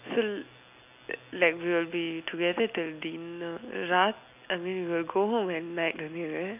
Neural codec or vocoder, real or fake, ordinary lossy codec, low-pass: none; real; none; 3.6 kHz